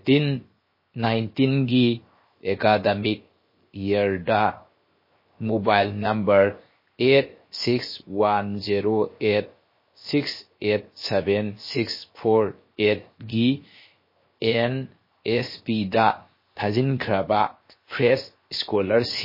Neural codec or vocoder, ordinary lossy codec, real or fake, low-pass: codec, 16 kHz, 0.7 kbps, FocalCodec; MP3, 24 kbps; fake; 5.4 kHz